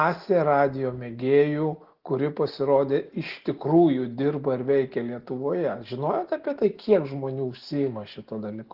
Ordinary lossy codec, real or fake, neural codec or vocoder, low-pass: Opus, 16 kbps; real; none; 5.4 kHz